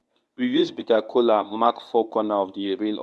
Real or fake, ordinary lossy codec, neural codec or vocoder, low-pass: fake; none; codec, 24 kHz, 0.9 kbps, WavTokenizer, medium speech release version 1; none